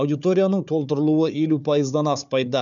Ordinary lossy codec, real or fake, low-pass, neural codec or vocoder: none; fake; 7.2 kHz; codec, 16 kHz, 4 kbps, FunCodec, trained on Chinese and English, 50 frames a second